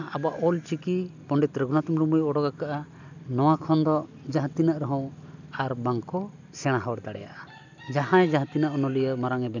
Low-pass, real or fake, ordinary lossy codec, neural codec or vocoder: 7.2 kHz; real; none; none